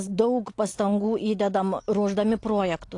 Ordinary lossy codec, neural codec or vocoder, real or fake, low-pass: AAC, 48 kbps; none; real; 10.8 kHz